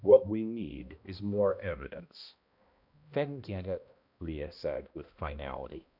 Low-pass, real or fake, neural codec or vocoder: 5.4 kHz; fake; codec, 16 kHz, 1 kbps, X-Codec, HuBERT features, trained on balanced general audio